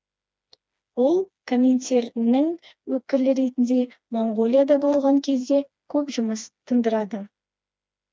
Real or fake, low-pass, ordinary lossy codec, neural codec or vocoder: fake; none; none; codec, 16 kHz, 2 kbps, FreqCodec, smaller model